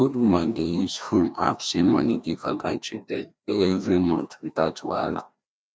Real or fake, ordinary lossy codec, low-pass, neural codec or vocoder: fake; none; none; codec, 16 kHz, 1 kbps, FreqCodec, larger model